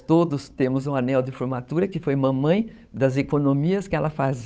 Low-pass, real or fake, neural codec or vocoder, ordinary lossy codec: none; fake; codec, 16 kHz, 8 kbps, FunCodec, trained on Chinese and English, 25 frames a second; none